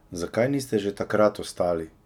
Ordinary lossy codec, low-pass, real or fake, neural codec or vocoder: none; 19.8 kHz; real; none